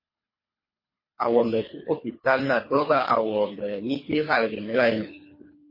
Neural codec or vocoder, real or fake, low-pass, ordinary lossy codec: codec, 24 kHz, 3 kbps, HILCodec; fake; 5.4 kHz; MP3, 24 kbps